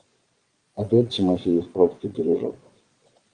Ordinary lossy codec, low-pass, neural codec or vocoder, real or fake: Opus, 32 kbps; 9.9 kHz; vocoder, 22.05 kHz, 80 mel bands, Vocos; fake